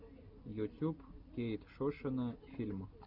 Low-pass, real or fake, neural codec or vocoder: 5.4 kHz; real; none